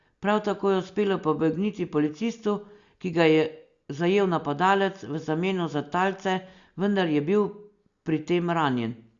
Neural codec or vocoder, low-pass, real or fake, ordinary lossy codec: none; 7.2 kHz; real; Opus, 64 kbps